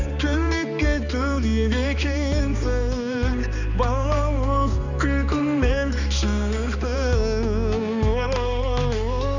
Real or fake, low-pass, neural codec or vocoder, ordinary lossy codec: fake; 7.2 kHz; codec, 16 kHz, 2 kbps, X-Codec, HuBERT features, trained on balanced general audio; none